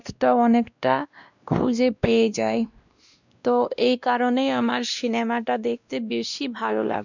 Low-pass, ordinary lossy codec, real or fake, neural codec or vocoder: 7.2 kHz; none; fake; codec, 16 kHz, 1 kbps, X-Codec, WavLM features, trained on Multilingual LibriSpeech